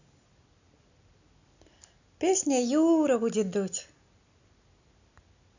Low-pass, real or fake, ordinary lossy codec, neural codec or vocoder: 7.2 kHz; real; none; none